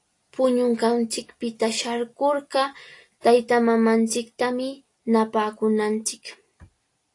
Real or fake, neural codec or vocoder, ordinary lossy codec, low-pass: real; none; AAC, 48 kbps; 10.8 kHz